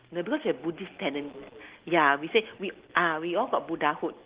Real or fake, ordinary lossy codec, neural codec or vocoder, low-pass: real; Opus, 16 kbps; none; 3.6 kHz